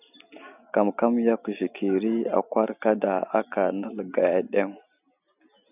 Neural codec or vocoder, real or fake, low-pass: none; real; 3.6 kHz